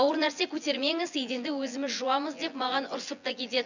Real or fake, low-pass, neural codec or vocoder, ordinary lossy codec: fake; 7.2 kHz; vocoder, 24 kHz, 100 mel bands, Vocos; none